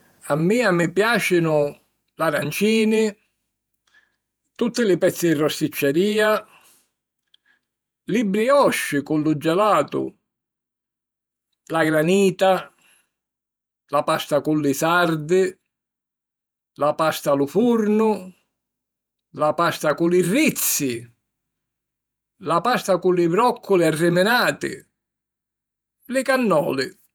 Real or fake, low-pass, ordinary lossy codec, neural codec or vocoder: fake; none; none; vocoder, 48 kHz, 128 mel bands, Vocos